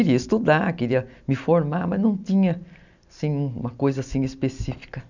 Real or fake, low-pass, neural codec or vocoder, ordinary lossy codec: real; 7.2 kHz; none; none